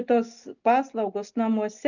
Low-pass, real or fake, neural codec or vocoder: 7.2 kHz; real; none